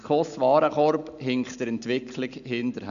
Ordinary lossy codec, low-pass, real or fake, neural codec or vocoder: none; 7.2 kHz; real; none